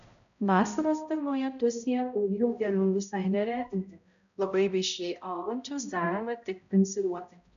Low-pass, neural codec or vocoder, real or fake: 7.2 kHz; codec, 16 kHz, 0.5 kbps, X-Codec, HuBERT features, trained on balanced general audio; fake